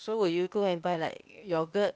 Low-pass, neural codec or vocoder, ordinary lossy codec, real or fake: none; codec, 16 kHz, 0.8 kbps, ZipCodec; none; fake